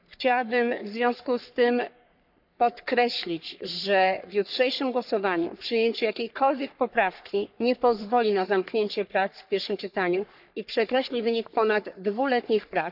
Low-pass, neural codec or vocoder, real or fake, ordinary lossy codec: 5.4 kHz; codec, 44.1 kHz, 3.4 kbps, Pupu-Codec; fake; none